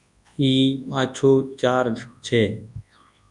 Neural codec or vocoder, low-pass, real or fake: codec, 24 kHz, 0.9 kbps, WavTokenizer, large speech release; 10.8 kHz; fake